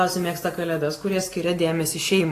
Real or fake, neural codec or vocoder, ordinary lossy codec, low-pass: real; none; AAC, 48 kbps; 14.4 kHz